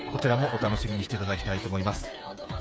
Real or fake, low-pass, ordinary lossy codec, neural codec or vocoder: fake; none; none; codec, 16 kHz, 8 kbps, FreqCodec, smaller model